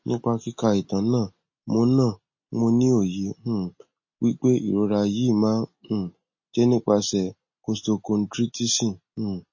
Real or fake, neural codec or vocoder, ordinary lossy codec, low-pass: real; none; MP3, 32 kbps; 7.2 kHz